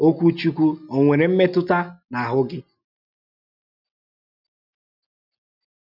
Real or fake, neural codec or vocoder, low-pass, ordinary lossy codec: real; none; 5.4 kHz; none